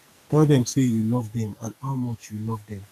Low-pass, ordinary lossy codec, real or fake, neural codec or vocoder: 14.4 kHz; none; fake; codec, 44.1 kHz, 2.6 kbps, SNAC